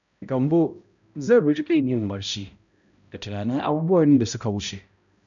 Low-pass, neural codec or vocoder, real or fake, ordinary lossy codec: 7.2 kHz; codec, 16 kHz, 0.5 kbps, X-Codec, HuBERT features, trained on balanced general audio; fake; none